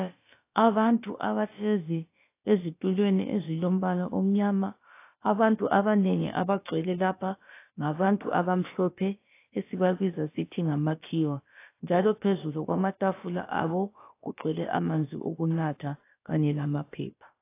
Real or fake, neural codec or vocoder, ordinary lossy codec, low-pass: fake; codec, 16 kHz, about 1 kbps, DyCAST, with the encoder's durations; AAC, 24 kbps; 3.6 kHz